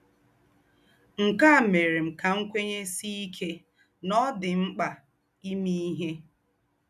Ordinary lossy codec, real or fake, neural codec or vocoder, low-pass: none; real; none; 14.4 kHz